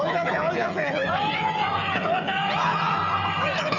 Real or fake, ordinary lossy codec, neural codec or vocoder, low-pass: fake; none; codec, 16 kHz, 8 kbps, FreqCodec, smaller model; 7.2 kHz